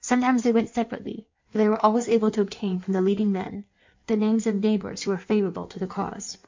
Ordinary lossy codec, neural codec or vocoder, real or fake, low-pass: MP3, 64 kbps; codec, 16 kHz in and 24 kHz out, 1.1 kbps, FireRedTTS-2 codec; fake; 7.2 kHz